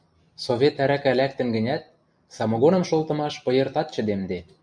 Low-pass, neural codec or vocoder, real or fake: 9.9 kHz; none; real